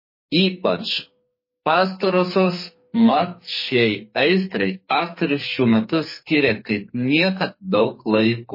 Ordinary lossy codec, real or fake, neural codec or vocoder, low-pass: MP3, 24 kbps; fake; codec, 44.1 kHz, 2.6 kbps, SNAC; 5.4 kHz